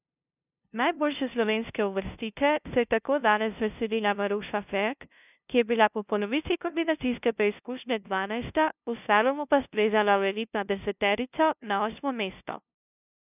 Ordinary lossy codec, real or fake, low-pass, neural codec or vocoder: none; fake; 3.6 kHz; codec, 16 kHz, 0.5 kbps, FunCodec, trained on LibriTTS, 25 frames a second